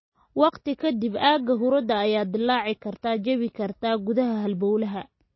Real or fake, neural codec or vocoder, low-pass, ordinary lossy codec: real; none; 7.2 kHz; MP3, 24 kbps